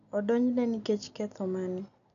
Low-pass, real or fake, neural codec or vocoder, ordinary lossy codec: 7.2 kHz; real; none; none